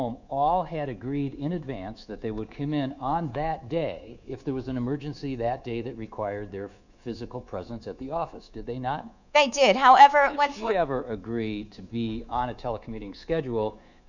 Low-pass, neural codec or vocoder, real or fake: 7.2 kHz; codec, 24 kHz, 3.1 kbps, DualCodec; fake